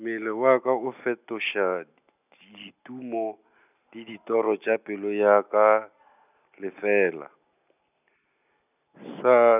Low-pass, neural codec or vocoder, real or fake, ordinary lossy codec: 3.6 kHz; none; real; none